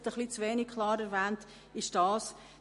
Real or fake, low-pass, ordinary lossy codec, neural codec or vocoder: real; 14.4 kHz; MP3, 48 kbps; none